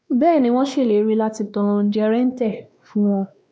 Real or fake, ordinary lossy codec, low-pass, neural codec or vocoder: fake; none; none; codec, 16 kHz, 2 kbps, X-Codec, WavLM features, trained on Multilingual LibriSpeech